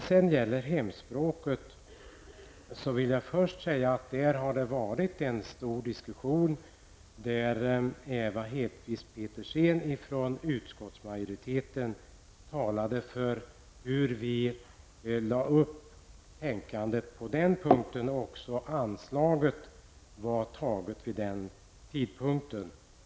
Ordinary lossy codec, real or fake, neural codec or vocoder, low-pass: none; real; none; none